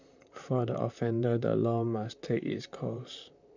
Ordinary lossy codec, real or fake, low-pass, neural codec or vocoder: none; fake; 7.2 kHz; vocoder, 44.1 kHz, 128 mel bands, Pupu-Vocoder